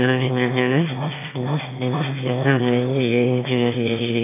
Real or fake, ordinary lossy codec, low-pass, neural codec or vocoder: fake; AAC, 24 kbps; 3.6 kHz; autoencoder, 22.05 kHz, a latent of 192 numbers a frame, VITS, trained on one speaker